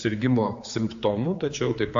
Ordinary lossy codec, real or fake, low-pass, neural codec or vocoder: AAC, 48 kbps; fake; 7.2 kHz; codec, 16 kHz, 4 kbps, X-Codec, HuBERT features, trained on general audio